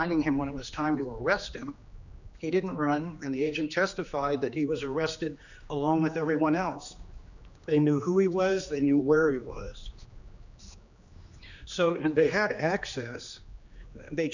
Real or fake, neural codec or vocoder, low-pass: fake; codec, 16 kHz, 2 kbps, X-Codec, HuBERT features, trained on general audio; 7.2 kHz